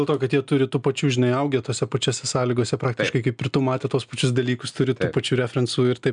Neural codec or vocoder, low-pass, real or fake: none; 9.9 kHz; real